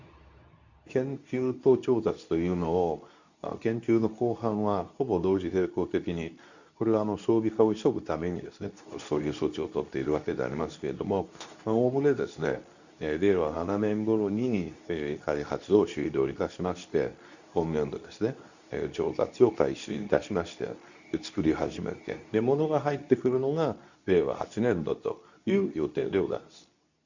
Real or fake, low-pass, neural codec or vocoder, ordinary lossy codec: fake; 7.2 kHz; codec, 24 kHz, 0.9 kbps, WavTokenizer, medium speech release version 2; none